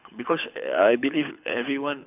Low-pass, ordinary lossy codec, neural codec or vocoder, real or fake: 3.6 kHz; none; codec, 24 kHz, 6 kbps, HILCodec; fake